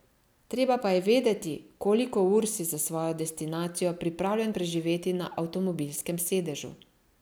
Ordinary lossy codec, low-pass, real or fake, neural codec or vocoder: none; none; real; none